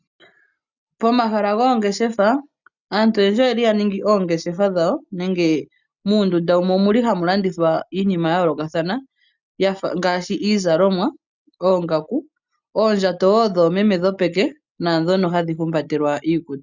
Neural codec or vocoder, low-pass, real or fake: none; 7.2 kHz; real